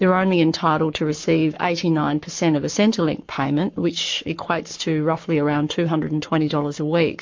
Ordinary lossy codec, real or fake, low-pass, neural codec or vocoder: MP3, 48 kbps; fake; 7.2 kHz; codec, 44.1 kHz, 7.8 kbps, Pupu-Codec